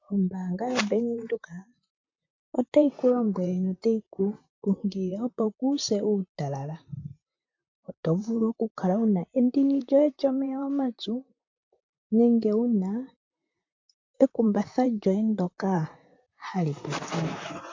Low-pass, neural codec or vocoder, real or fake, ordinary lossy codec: 7.2 kHz; vocoder, 44.1 kHz, 128 mel bands, Pupu-Vocoder; fake; MP3, 64 kbps